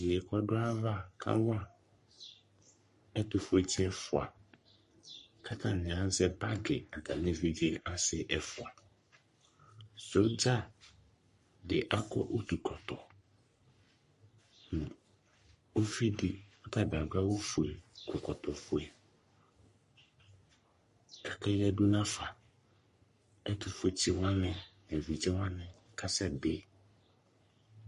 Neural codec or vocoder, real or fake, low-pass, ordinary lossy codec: codec, 44.1 kHz, 3.4 kbps, Pupu-Codec; fake; 14.4 kHz; MP3, 48 kbps